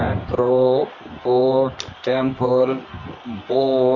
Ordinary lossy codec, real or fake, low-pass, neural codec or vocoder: Opus, 64 kbps; fake; 7.2 kHz; codec, 24 kHz, 0.9 kbps, WavTokenizer, medium music audio release